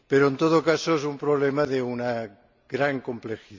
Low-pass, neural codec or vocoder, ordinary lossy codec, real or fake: 7.2 kHz; none; MP3, 64 kbps; real